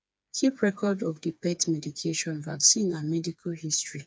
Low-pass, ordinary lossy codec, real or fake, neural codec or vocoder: none; none; fake; codec, 16 kHz, 4 kbps, FreqCodec, smaller model